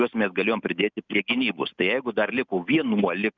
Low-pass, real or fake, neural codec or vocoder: 7.2 kHz; real; none